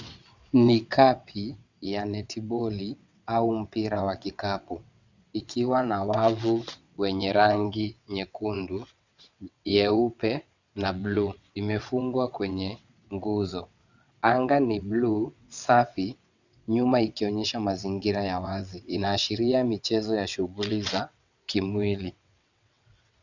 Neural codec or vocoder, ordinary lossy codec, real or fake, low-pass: vocoder, 22.05 kHz, 80 mel bands, WaveNeXt; Opus, 64 kbps; fake; 7.2 kHz